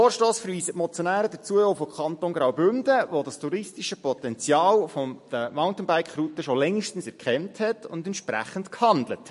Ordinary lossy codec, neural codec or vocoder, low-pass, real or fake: MP3, 48 kbps; none; 14.4 kHz; real